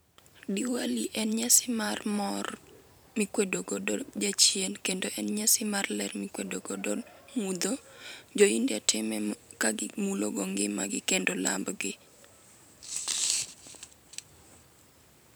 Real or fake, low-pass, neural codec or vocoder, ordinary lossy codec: fake; none; vocoder, 44.1 kHz, 128 mel bands every 512 samples, BigVGAN v2; none